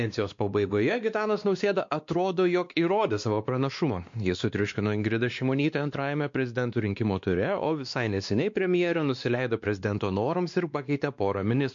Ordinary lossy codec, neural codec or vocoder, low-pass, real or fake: MP3, 48 kbps; codec, 16 kHz, 2 kbps, X-Codec, WavLM features, trained on Multilingual LibriSpeech; 7.2 kHz; fake